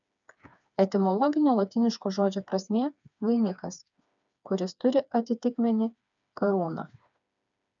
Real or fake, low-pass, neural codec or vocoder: fake; 7.2 kHz; codec, 16 kHz, 4 kbps, FreqCodec, smaller model